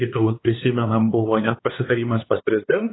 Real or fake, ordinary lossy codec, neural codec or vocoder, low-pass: fake; AAC, 16 kbps; codec, 16 kHz, 2 kbps, X-Codec, HuBERT features, trained on LibriSpeech; 7.2 kHz